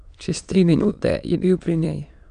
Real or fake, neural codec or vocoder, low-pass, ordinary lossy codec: fake; autoencoder, 22.05 kHz, a latent of 192 numbers a frame, VITS, trained on many speakers; 9.9 kHz; none